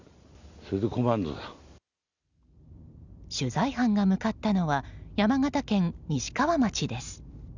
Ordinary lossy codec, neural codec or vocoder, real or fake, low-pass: none; none; real; 7.2 kHz